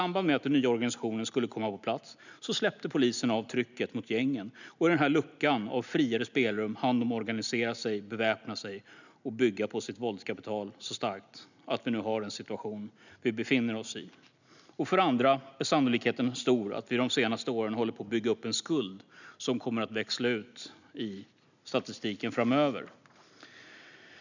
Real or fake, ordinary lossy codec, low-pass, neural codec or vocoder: real; none; 7.2 kHz; none